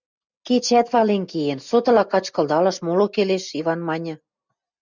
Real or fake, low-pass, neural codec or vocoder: real; 7.2 kHz; none